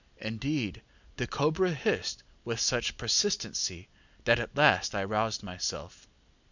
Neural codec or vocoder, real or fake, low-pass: none; real; 7.2 kHz